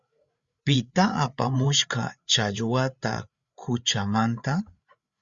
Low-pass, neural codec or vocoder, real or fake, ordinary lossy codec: 7.2 kHz; codec, 16 kHz, 8 kbps, FreqCodec, larger model; fake; Opus, 64 kbps